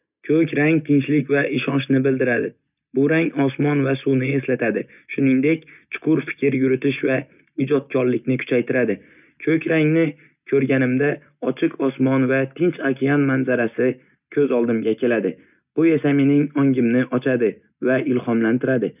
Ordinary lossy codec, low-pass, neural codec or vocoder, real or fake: none; 3.6 kHz; none; real